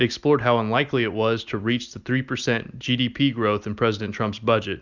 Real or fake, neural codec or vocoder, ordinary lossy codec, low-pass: real; none; Opus, 64 kbps; 7.2 kHz